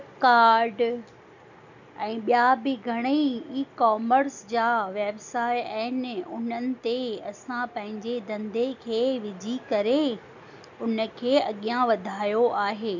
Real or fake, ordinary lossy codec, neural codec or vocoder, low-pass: real; none; none; 7.2 kHz